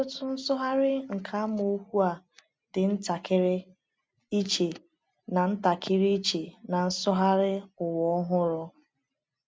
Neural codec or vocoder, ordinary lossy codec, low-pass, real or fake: none; none; none; real